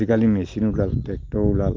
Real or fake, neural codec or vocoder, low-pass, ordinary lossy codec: real; none; 7.2 kHz; Opus, 32 kbps